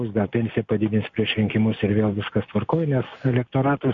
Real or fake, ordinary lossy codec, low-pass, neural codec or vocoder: real; MP3, 48 kbps; 7.2 kHz; none